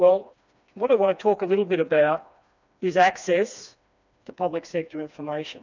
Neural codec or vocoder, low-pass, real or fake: codec, 16 kHz, 2 kbps, FreqCodec, smaller model; 7.2 kHz; fake